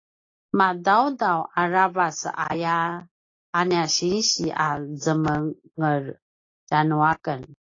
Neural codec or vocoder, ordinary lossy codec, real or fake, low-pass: none; AAC, 32 kbps; real; 7.2 kHz